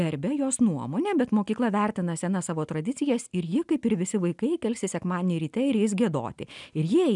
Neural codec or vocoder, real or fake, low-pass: none; real; 10.8 kHz